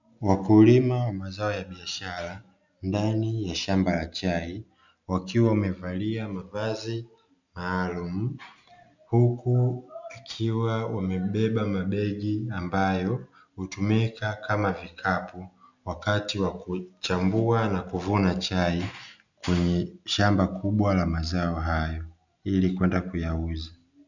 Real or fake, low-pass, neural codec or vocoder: real; 7.2 kHz; none